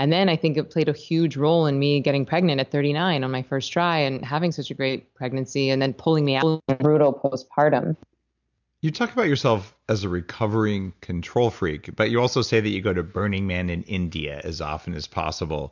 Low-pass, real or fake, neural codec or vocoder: 7.2 kHz; real; none